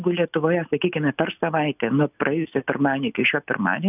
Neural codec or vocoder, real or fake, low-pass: none; real; 3.6 kHz